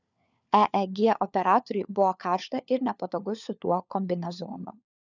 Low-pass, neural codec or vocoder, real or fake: 7.2 kHz; codec, 16 kHz, 4 kbps, FunCodec, trained on LibriTTS, 50 frames a second; fake